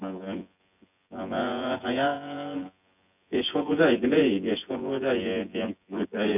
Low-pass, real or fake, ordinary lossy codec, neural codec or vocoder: 3.6 kHz; fake; none; vocoder, 24 kHz, 100 mel bands, Vocos